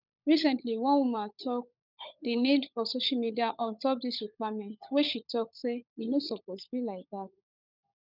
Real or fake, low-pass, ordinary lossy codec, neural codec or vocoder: fake; 5.4 kHz; none; codec, 16 kHz, 16 kbps, FunCodec, trained on LibriTTS, 50 frames a second